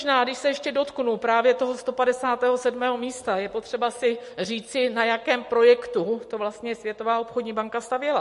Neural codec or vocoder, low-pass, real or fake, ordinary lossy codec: none; 14.4 kHz; real; MP3, 48 kbps